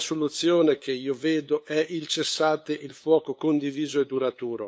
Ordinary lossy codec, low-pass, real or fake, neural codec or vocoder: none; none; fake; codec, 16 kHz, 8 kbps, FunCodec, trained on LibriTTS, 25 frames a second